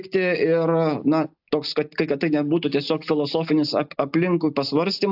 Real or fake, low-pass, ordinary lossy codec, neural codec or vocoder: real; 5.4 kHz; AAC, 48 kbps; none